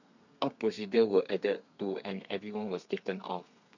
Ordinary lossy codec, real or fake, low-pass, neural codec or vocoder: none; fake; 7.2 kHz; codec, 32 kHz, 1.9 kbps, SNAC